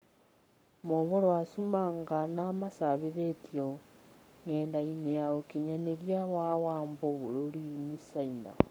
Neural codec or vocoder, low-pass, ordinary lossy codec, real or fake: codec, 44.1 kHz, 7.8 kbps, Pupu-Codec; none; none; fake